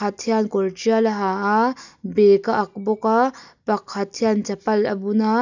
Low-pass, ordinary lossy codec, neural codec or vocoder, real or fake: 7.2 kHz; none; none; real